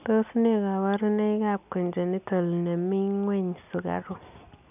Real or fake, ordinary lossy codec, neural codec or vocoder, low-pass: real; none; none; 3.6 kHz